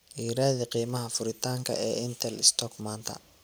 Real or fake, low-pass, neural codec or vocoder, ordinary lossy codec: real; none; none; none